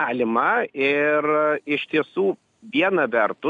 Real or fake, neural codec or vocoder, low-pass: real; none; 10.8 kHz